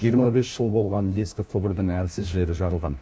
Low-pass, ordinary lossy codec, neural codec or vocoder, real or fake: none; none; codec, 16 kHz, 1 kbps, FunCodec, trained on LibriTTS, 50 frames a second; fake